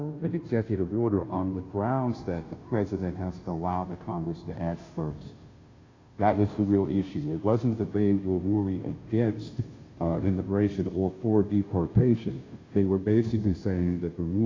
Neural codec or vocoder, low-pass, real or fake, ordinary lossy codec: codec, 16 kHz, 0.5 kbps, FunCodec, trained on Chinese and English, 25 frames a second; 7.2 kHz; fake; AAC, 32 kbps